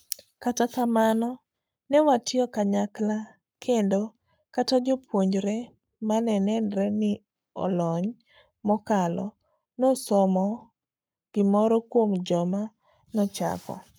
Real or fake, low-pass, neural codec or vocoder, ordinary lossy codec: fake; none; codec, 44.1 kHz, 7.8 kbps, Pupu-Codec; none